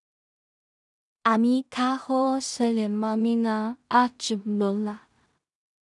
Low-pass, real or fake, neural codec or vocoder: 10.8 kHz; fake; codec, 16 kHz in and 24 kHz out, 0.4 kbps, LongCat-Audio-Codec, two codebook decoder